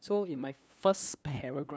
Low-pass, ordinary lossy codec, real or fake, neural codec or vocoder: none; none; fake; codec, 16 kHz, 2 kbps, FunCodec, trained on LibriTTS, 25 frames a second